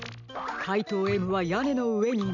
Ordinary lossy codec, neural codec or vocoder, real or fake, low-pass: none; none; real; 7.2 kHz